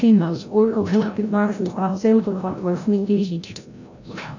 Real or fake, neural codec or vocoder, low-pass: fake; codec, 16 kHz, 0.5 kbps, FreqCodec, larger model; 7.2 kHz